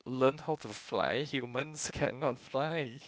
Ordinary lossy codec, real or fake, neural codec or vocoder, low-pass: none; fake; codec, 16 kHz, 0.8 kbps, ZipCodec; none